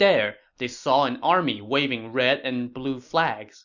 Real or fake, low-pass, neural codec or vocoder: real; 7.2 kHz; none